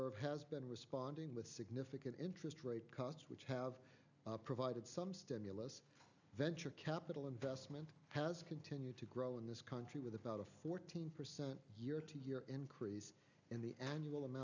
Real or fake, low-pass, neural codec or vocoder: real; 7.2 kHz; none